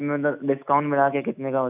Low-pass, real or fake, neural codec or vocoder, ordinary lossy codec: 3.6 kHz; fake; autoencoder, 48 kHz, 128 numbers a frame, DAC-VAE, trained on Japanese speech; AAC, 32 kbps